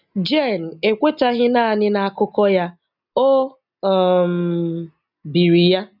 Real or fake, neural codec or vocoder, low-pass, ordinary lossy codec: real; none; 5.4 kHz; none